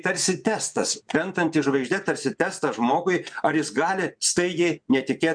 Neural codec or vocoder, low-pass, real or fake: vocoder, 24 kHz, 100 mel bands, Vocos; 9.9 kHz; fake